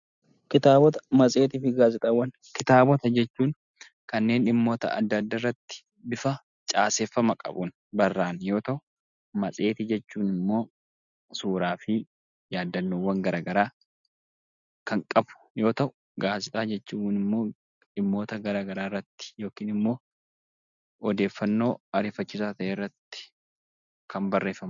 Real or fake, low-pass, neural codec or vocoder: real; 7.2 kHz; none